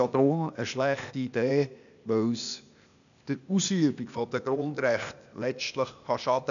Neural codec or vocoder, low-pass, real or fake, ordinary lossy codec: codec, 16 kHz, 0.8 kbps, ZipCodec; 7.2 kHz; fake; none